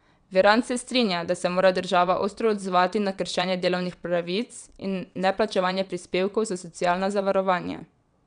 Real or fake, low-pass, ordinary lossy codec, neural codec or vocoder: fake; 9.9 kHz; none; vocoder, 22.05 kHz, 80 mel bands, WaveNeXt